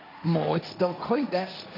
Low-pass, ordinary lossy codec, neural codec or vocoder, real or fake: 5.4 kHz; none; codec, 16 kHz, 1.1 kbps, Voila-Tokenizer; fake